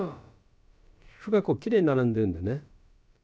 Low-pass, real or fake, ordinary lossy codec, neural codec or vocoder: none; fake; none; codec, 16 kHz, about 1 kbps, DyCAST, with the encoder's durations